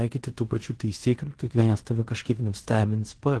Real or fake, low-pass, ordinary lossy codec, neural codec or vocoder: fake; 10.8 kHz; Opus, 16 kbps; codec, 16 kHz in and 24 kHz out, 0.9 kbps, LongCat-Audio-Codec, fine tuned four codebook decoder